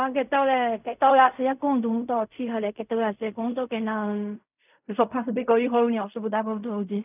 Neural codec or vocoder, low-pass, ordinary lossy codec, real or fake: codec, 16 kHz in and 24 kHz out, 0.4 kbps, LongCat-Audio-Codec, fine tuned four codebook decoder; 3.6 kHz; none; fake